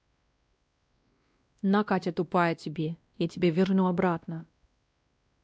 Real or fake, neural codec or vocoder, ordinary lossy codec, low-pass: fake; codec, 16 kHz, 1 kbps, X-Codec, WavLM features, trained on Multilingual LibriSpeech; none; none